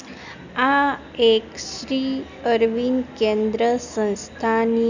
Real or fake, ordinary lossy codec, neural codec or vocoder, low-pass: real; none; none; 7.2 kHz